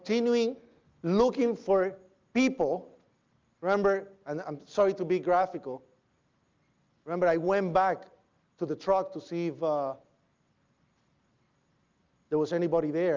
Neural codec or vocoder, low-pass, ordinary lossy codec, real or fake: none; 7.2 kHz; Opus, 32 kbps; real